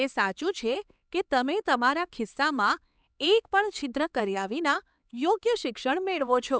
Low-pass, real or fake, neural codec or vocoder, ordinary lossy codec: none; fake; codec, 16 kHz, 4 kbps, X-Codec, HuBERT features, trained on LibriSpeech; none